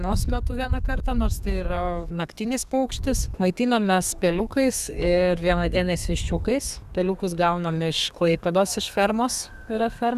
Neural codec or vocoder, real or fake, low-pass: codec, 32 kHz, 1.9 kbps, SNAC; fake; 14.4 kHz